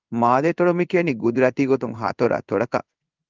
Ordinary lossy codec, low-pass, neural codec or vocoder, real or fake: Opus, 24 kbps; 7.2 kHz; codec, 16 kHz in and 24 kHz out, 1 kbps, XY-Tokenizer; fake